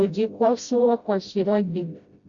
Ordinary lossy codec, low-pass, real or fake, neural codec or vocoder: Opus, 64 kbps; 7.2 kHz; fake; codec, 16 kHz, 0.5 kbps, FreqCodec, smaller model